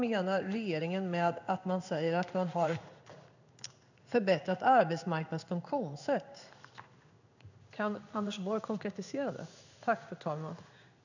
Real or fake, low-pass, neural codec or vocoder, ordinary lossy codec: fake; 7.2 kHz; codec, 16 kHz in and 24 kHz out, 1 kbps, XY-Tokenizer; none